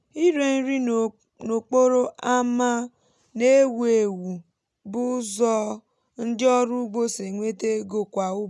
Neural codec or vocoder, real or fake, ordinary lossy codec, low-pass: none; real; none; none